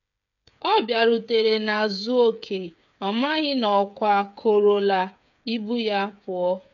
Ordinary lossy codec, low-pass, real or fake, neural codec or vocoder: none; 7.2 kHz; fake; codec, 16 kHz, 8 kbps, FreqCodec, smaller model